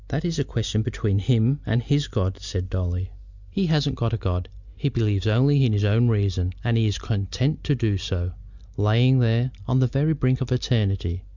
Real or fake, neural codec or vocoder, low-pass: real; none; 7.2 kHz